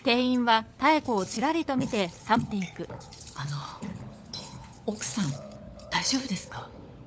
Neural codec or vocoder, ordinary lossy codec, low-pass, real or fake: codec, 16 kHz, 16 kbps, FunCodec, trained on LibriTTS, 50 frames a second; none; none; fake